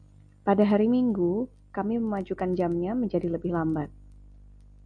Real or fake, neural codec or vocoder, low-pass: real; none; 9.9 kHz